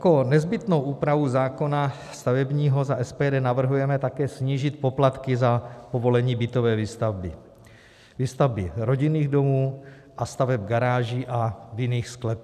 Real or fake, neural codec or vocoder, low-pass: real; none; 14.4 kHz